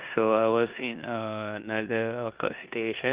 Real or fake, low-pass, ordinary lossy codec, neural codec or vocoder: fake; 3.6 kHz; Opus, 24 kbps; codec, 16 kHz in and 24 kHz out, 0.9 kbps, LongCat-Audio-Codec, four codebook decoder